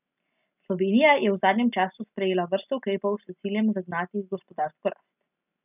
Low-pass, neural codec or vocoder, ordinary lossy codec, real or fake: 3.6 kHz; none; none; real